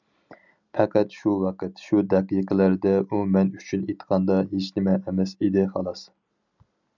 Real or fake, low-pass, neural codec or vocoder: real; 7.2 kHz; none